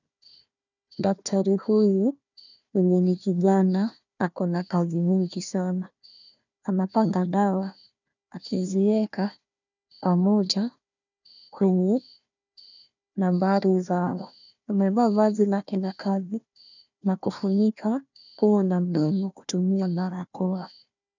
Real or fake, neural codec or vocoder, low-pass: fake; codec, 16 kHz, 1 kbps, FunCodec, trained on Chinese and English, 50 frames a second; 7.2 kHz